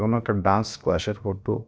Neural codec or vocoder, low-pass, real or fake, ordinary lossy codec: codec, 16 kHz, 0.7 kbps, FocalCodec; none; fake; none